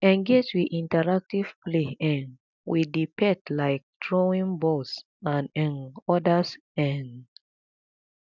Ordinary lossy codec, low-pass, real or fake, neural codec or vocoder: none; 7.2 kHz; real; none